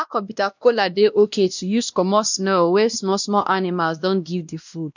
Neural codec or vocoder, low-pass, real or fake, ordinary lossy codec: codec, 16 kHz, 1 kbps, X-Codec, WavLM features, trained on Multilingual LibriSpeech; 7.2 kHz; fake; none